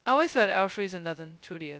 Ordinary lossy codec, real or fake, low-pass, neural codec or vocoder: none; fake; none; codec, 16 kHz, 0.2 kbps, FocalCodec